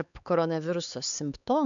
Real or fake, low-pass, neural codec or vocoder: fake; 7.2 kHz; codec, 16 kHz, 4 kbps, X-Codec, HuBERT features, trained on LibriSpeech